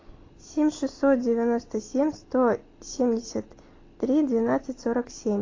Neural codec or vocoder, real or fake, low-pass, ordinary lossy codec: none; real; 7.2 kHz; AAC, 32 kbps